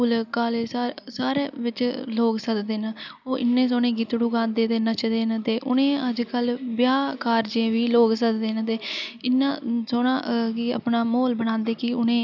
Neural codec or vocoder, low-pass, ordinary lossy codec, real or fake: none; 7.2 kHz; none; real